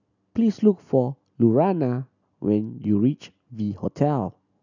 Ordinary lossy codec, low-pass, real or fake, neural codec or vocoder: none; 7.2 kHz; real; none